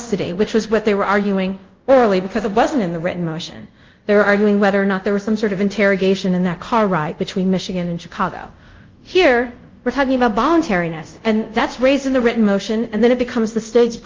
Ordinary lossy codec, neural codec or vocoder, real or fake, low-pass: Opus, 24 kbps; codec, 24 kHz, 0.5 kbps, DualCodec; fake; 7.2 kHz